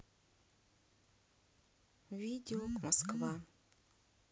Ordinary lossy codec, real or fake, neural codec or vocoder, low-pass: none; real; none; none